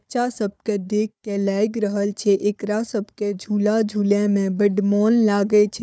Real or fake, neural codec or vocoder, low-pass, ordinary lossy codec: fake; codec, 16 kHz, 4 kbps, FunCodec, trained on Chinese and English, 50 frames a second; none; none